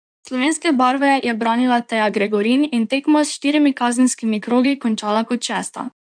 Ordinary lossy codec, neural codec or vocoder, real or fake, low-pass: none; codec, 16 kHz in and 24 kHz out, 2.2 kbps, FireRedTTS-2 codec; fake; 9.9 kHz